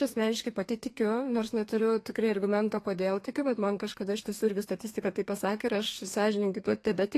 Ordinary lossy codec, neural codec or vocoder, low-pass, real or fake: AAC, 48 kbps; codec, 32 kHz, 1.9 kbps, SNAC; 14.4 kHz; fake